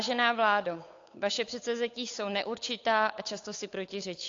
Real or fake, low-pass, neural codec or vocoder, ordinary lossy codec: fake; 7.2 kHz; codec, 16 kHz, 8 kbps, FunCodec, trained on Chinese and English, 25 frames a second; AAC, 48 kbps